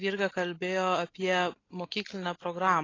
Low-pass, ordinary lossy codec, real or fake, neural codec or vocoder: 7.2 kHz; AAC, 32 kbps; real; none